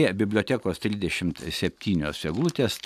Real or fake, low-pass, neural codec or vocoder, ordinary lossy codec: real; 14.4 kHz; none; AAC, 96 kbps